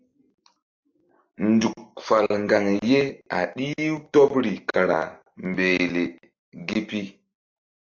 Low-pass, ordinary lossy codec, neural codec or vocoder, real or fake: 7.2 kHz; AAC, 48 kbps; none; real